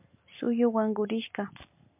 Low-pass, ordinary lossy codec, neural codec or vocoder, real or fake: 3.6 kHz; MP3, 32 kbps; none; real